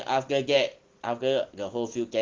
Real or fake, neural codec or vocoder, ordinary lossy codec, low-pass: real; none; Opus, 16 kbps; 7.2 kHz